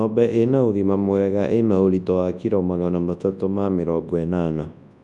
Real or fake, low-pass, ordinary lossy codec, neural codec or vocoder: fake; 10.8 kHz; none; codec, 24 kHz, 0.9 kbps, WavTokenizer, large speech release